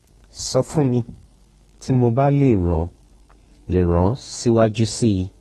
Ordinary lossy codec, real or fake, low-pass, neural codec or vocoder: AAC, 32 kbps; fake; 14.4 kHz; codec, 32 kHz, 1.9 kbps, SNAC